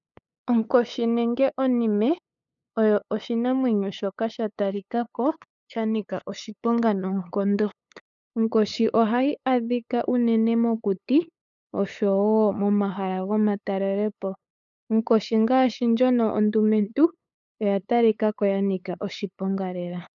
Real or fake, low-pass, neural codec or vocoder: fake; 7.2 kHz; codec, 16 kHz, 8 kbps, FunCodec, trained on LibriTTS, 25 frames a second